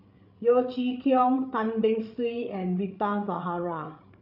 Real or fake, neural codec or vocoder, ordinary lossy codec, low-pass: fake; codec, 16 kHz, 8 kbps, FreqCodec, larger model; none; 5.4 kHz